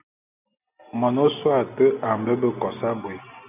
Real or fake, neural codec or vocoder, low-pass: real; none; 3.6 kHz